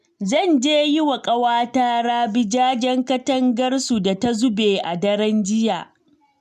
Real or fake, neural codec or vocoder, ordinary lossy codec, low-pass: real; none; MP3, 96 kbps; 14.4 kHz